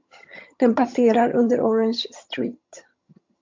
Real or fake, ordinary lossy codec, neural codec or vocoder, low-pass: fake; MP3, 48 kbps; vocoder, 22.05 kHz, 80 mel bands, HiFi-GAN; 7.2 kHz